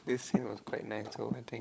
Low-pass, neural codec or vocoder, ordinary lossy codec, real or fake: none; codec, 16 kHz, 16 kbps, FunCodec, trained on LibriTTS, 50 frames a second; none; fake